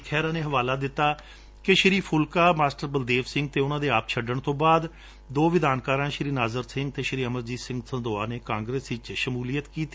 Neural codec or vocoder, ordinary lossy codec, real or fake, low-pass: none; none; real; none